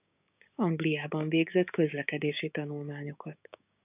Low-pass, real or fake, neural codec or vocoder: 3.6 kHz; fake; codec, 16 kHz, 6 kbps, DAC